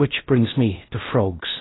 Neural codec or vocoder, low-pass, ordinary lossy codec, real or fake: codec, 16 kHz in and 24 kHz out, 0.6 kbps, FocalCodec, streaming, 4096 codes; 7.2 kHz; AAC, 16 kbps; fake